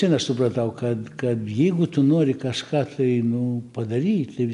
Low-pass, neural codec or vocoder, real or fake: 10.8 kHz; none; real